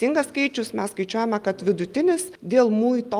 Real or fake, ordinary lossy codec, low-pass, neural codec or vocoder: real; Opus, 32 kbps; 14.4 kHz; none